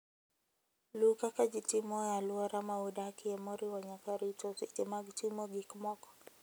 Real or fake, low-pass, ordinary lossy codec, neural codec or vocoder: real; none; none; none